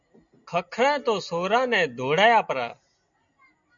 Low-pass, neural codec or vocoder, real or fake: 7.2 kHz; none; real